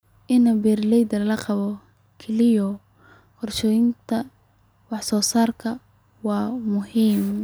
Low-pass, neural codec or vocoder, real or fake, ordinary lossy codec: none; none; real; none